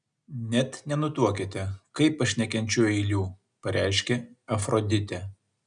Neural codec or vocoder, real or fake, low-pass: none; real; 9.9 kHz